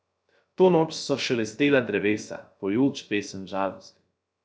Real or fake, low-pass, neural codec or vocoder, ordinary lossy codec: fake; none; codec, 16 kHz, 0.3 kbps, FocalCodec; none